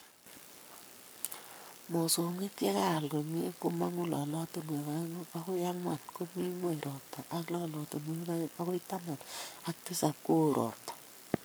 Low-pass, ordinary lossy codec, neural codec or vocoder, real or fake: none; none; codec, 44.1 kHz, 7.8 kbps, Pupu-Codec; fake